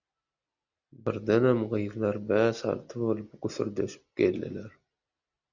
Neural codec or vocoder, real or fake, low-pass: none; real; 7.2 kHz